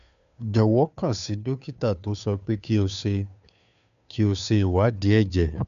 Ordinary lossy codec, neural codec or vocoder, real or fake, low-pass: none; codec, 16 kHz, 2 kbps, FunCodec, trained on Chinese and English, 25 frames a second; fake; 7.2 kHz